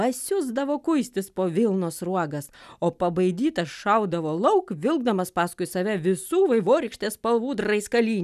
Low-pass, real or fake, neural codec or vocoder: 14.4 kHz; real; none